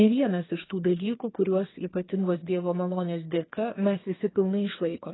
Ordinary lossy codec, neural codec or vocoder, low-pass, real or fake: AAC, 16 kbps; codec, 32 kHz, 1.9 kbps, SNAC; 7.2 kHz; fake